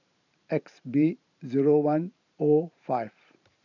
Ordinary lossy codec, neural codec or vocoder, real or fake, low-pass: none; vocoder, 44.1 kHz, 128 mel bands every 512 samples, BigVGAN v2; fake; 7.2 kHz